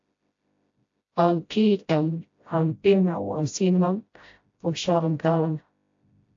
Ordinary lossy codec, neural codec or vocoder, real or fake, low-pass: AAC, 48 kbps; codec, 16 kHz, 0.5 kbps, FreqCodec, smaller model; fake; 7.2 kHz